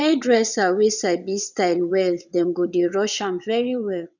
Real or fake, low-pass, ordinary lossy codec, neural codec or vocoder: fake; 7.2 kHz; none; vocoder, 22.05 kHz, 80 mel bands, WaveNeXt